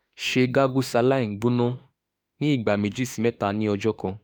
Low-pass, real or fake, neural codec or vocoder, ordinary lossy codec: none; fake; autoencoder, 48 kHz, 32 numbers a frame, DAC-VAE, trained on Japanese speech; none